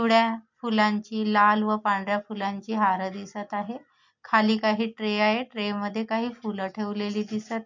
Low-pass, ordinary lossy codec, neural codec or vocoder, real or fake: 7.2 kHz; MP3, 48 kbps; none; real